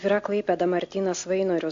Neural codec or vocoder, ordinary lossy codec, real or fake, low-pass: none; MP3, 48 kbps; real; 7.2 kHz